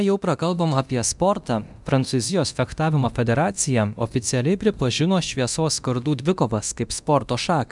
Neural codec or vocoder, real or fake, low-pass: codec, 24 kHz, 0.9 kbps, DualCodec; fake; 10.8 kHz